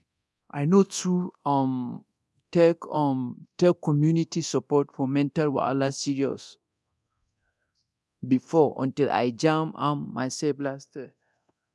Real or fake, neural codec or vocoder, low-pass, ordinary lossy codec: fake; codec, 24 kHz, 0.9 kbps, DualCodec; none; none